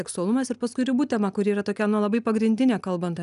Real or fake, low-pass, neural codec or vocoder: real; 10.8 kHz; none